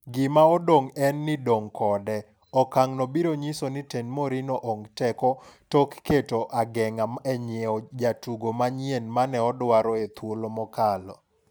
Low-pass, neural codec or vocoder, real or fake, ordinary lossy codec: none; none; real; none